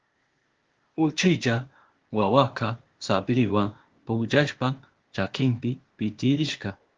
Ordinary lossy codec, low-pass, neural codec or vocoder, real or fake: Opus, 16 kbps; 7.2 kHz; codec, 16 kHz, 0.8 kbps, ZipCodec; fake